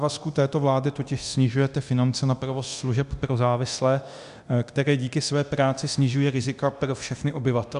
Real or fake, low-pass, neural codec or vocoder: fake; 10.8 kHz; codec, 24 kHz, 0.9 kbps, DualCodec